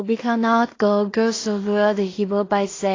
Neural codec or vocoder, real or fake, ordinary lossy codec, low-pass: codec, 16 kHz in and 24 kHz out, 0.4 kbps, LongCat-Audio-Codec, two codebook decoder; fake; AAC, 32 kbps; 7.2 kHz